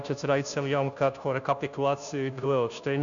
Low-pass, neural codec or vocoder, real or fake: 7.2 kHz; codec, 16 kHz, 0.5 kbps, FunCodec, trained on Chinese and English, 25 frames a second; fake